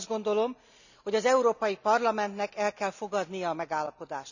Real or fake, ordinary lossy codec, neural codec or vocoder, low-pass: real; none; none; 7.2 kHz